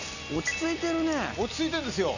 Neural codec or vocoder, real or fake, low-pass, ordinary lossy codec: none; real; 7.2 kHz; none